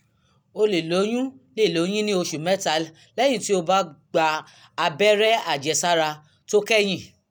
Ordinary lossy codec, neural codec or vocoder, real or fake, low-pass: none; none; real; none